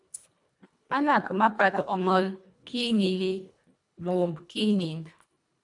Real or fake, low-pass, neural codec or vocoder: fake; 10.8 kHz; codec, 24 kHz, 1.5 kbps, HILCodec